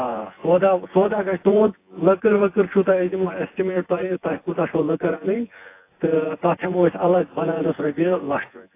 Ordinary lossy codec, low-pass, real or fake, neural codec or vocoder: AAC, 24 kbps; 3.6 kHz; fake; vocoder, 24 kHz, 100 mel bands, Vocos